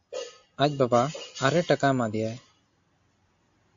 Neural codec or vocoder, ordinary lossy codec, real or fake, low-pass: none; AAC, 64 kbps; real; 7.2 kHz